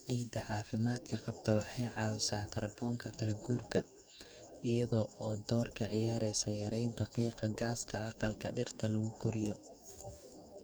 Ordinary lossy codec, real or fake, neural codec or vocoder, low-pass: none; fake; codec, 44.1 kHz, 2.6 kbps, DAC; none